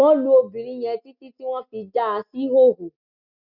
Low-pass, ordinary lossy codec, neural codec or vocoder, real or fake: 5.4 kHz; none; none; real